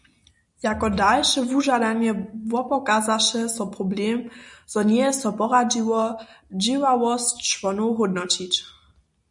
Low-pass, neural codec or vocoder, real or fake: 10.8 kHz; none; real